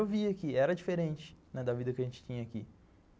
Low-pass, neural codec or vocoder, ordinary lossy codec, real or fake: none; none; none; real